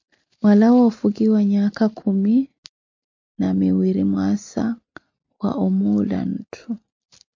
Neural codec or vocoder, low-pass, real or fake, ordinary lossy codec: none; 7.2 kHz; real; MP3, 48 kbps